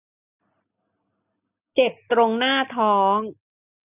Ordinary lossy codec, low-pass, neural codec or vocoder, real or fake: none; 3.6 kHz; none; real